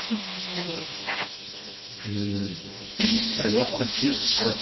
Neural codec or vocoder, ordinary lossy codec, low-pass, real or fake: codec, 16 kHz, 1 kbps, FreqCodec, smaller model; MP3, 24 kbps; 7.2 kHz; fake